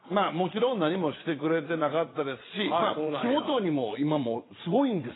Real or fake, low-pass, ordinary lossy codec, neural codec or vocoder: fake; 7.2 kHz; AAC, 16 kbps; vocoder, 22.05 kHz, 80 mel bands, Vocos